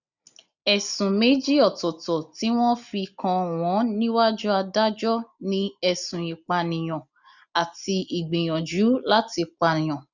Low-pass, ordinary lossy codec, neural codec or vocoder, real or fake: 7.2 kHz; none; none; real